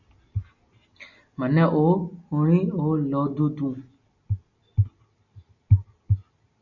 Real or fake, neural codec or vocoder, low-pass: real; none; 7.2 kHz